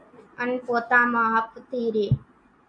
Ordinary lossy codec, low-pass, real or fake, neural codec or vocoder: MP3, 48 kbps; 9.9 kHz; real; none